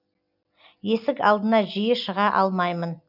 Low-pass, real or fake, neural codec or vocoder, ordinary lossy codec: 5.4 kHz; real; none; none